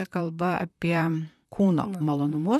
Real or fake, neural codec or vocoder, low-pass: fake; vocoder, 44.1 kHz, 128 mel bands, Pupu-Vocoder; 14.4 kHz